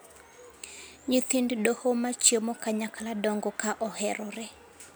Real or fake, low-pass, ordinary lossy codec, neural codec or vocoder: real; none; none; none